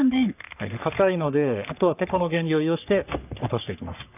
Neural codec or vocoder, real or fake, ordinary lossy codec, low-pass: codec, 44.1 kHz, 3.4 kbps, Pupu-Codec; fake; none; 3.6 kHz